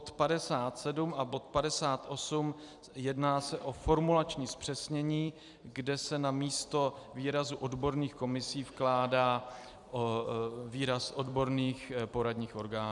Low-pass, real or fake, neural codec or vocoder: 10.8 kHz; fake; vocoder, 44.1 kHz, 128 mel bands every 256 samples, BigVGAN v2